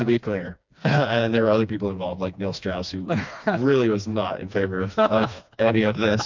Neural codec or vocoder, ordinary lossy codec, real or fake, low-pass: codec, 16 kHz, 2 kbps, FreqCodec, smaller model; MP3, 64 kbps; fake; 7.2 kHz